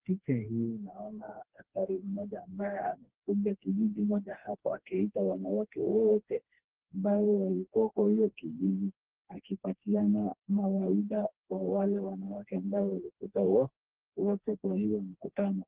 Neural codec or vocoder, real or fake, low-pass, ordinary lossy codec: codec, 16 kHz, 2 kbps, FreqCodec, smaller model; fake; 3.6 kHz; Opus, 16 kbps